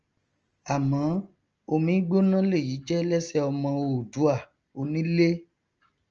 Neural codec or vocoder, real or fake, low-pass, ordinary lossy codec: none; real; 7.2 kHz; Opus, 24 kbps